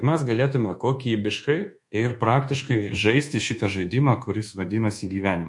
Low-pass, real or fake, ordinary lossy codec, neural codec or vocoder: 10.8 kHz; fake; MP3, 48 kbps; codec, 24 kHz, 1.2 kbps, DualCodec